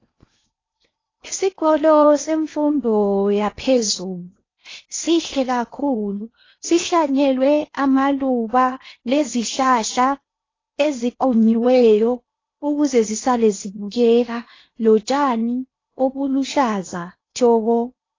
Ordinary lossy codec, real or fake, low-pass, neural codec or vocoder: AAC, 32 kbps; fake; 7.2 kHz; codec, 16 kHz in and 24 kHz out, 0.8 kbps, FocalCodec, streaming, 65536 codes